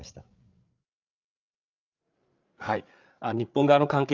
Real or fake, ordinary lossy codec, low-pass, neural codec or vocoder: fake; Opus, 32 kbps; 7.2 kHz; codec, 16 kHz, 8 kbps, FreqCodec, larger model